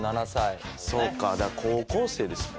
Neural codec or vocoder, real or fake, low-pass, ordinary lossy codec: none; real; none; none